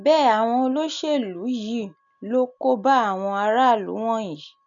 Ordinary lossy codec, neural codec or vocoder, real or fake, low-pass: none; none; real; 7.2 kHz